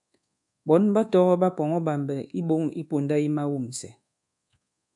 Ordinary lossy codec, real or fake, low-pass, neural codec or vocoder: MP3, 96 kbps; fake; 10.8 kHz; codec, 24 kHz, 1.2 kbps, DualCodec